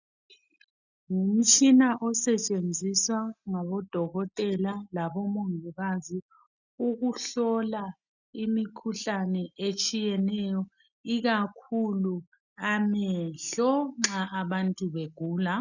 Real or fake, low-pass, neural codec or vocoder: real; 7.2 kHz; none